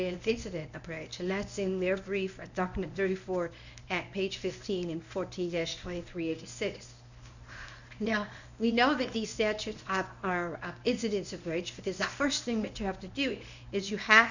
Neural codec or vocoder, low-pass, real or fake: codec, 24 kHz, 0.9 kbps, WavTokenizer, medium speech release version 1; 7.2 kHz; fake